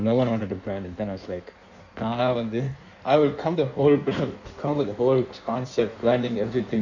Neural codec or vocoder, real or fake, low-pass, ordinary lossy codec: codec, 16 kHz in and 24 kHz out, 1.1 kbps, FireRedTTS-2 codec; fake; 7.2 kHz; none